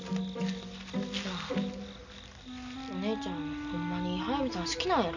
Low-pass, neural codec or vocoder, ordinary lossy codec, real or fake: 7.2 kHz; none; none; real